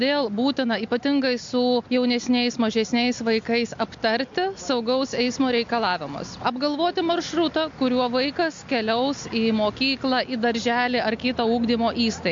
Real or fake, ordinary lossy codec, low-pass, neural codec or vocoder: real; MP3, 48 kbps; 7.2 kHz; none